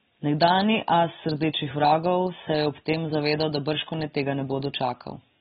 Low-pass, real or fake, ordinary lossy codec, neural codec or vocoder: 7.2 kHz; real; AAC, 16 kbps; none